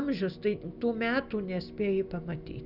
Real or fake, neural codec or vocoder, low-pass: real; none; 5.4 kHz